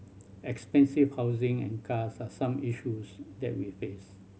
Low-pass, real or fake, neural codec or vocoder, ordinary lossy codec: none; real; none; none